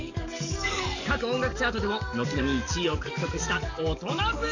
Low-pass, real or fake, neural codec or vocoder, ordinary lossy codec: 7.2 kHz; fake; codec, 44.1 kHz, 7.8 kbps, DAC; none